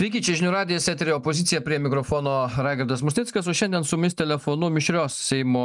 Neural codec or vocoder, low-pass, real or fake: none; 10.8 kHz; real